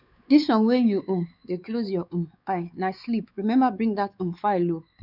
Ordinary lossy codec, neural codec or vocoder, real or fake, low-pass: none; codec, 16 kHz, 4 kbps, X-Codec, WavLM features, trained on Multilingual LibriSpeech; fake; 5.4 kHz